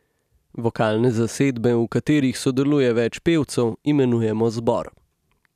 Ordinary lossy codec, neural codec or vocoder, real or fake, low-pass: none; none; real; 14.4 kHz